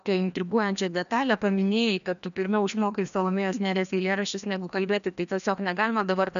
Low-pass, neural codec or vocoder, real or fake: 7.2 kHz; codec, 16 kHz, 1 kbps, FreqCodec, larger model; fake